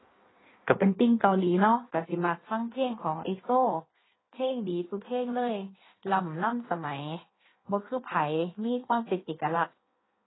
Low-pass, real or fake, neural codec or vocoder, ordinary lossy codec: 7.2 kHz; fake; codec, 16 kHz in and 24 kHz out, 1.1 kbps, FireRedTTS-2 codec; AAC, 16 kbps